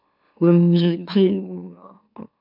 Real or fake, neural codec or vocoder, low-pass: fake; autoencoder, 44.1 kHz, a latent of 192 numbers a frame, MeloTTS; 5.4 kHz